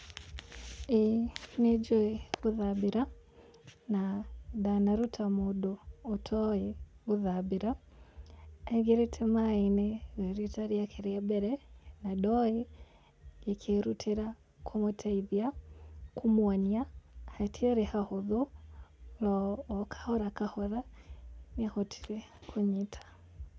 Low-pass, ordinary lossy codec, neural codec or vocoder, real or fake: none; none; none; real